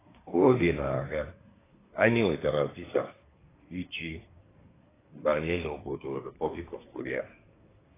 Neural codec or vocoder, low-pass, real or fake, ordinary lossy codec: codec, 24 kHz, 1 kbps, SNAC; 3.6 kHz; fake; AAC, 16 kbps